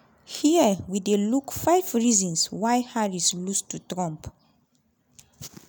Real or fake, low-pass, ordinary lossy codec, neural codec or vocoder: real; none; none; none